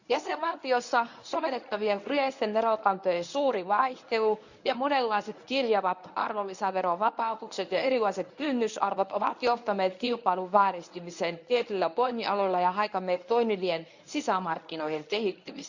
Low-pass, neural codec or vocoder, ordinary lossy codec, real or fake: 7.2 kHz; codec, 24 kHz, 0.9 kbps, WavTokenizer, medium speech release version 1; none; fake